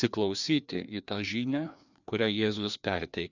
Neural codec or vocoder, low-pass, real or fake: codec, 16 kHz, 2 kbps, FreqCodec, larger model; 7.2 kHz; fake